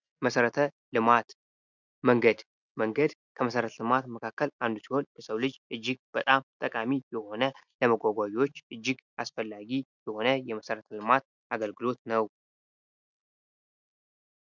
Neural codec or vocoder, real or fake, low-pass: none; real; 7.2 kHz